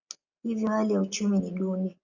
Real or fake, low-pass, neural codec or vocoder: real; 7.2 kHz; none